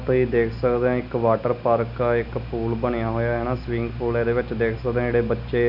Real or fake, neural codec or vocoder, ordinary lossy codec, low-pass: real; none; none; 5.4 kHz